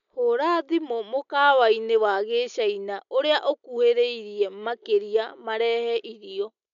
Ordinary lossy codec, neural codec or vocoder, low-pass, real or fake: none; none; 7.2 kHz; real